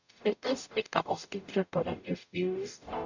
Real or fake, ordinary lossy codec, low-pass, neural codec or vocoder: fake; none; 7.2 kHz; codec, 44.1 kHz, 0.9 kbps, DAC